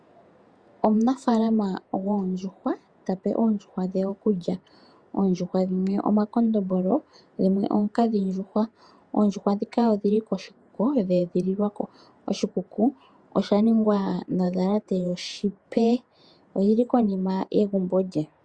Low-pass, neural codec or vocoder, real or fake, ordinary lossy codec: 9.9 kHz; vocoder, 48 kHz, 128 mel bands, Vocos; fake; Opus, 64 kbps